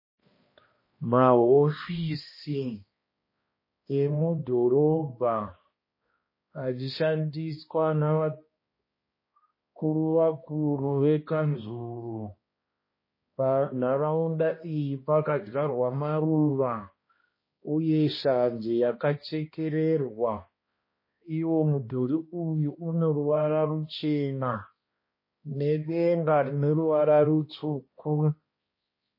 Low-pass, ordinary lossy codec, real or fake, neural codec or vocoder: 5.4 kHz; MP3, 24 kbps; fake; codec, 16 kHz, 1 kbps, X-Codec, HuBERT features, trained on balanced general audio